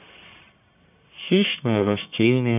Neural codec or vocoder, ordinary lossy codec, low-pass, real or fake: codec, 44.1 kHz, 1.7 kbps, Pupu-Codec; none; 3.6 kHz; fake